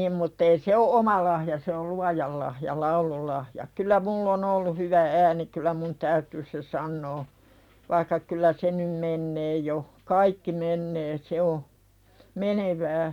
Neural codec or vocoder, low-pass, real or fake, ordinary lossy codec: vocoder, 44.1 kHz, 128 mel bands every 256 samples, BigVGAN v2; 19.8 kHz; fake; none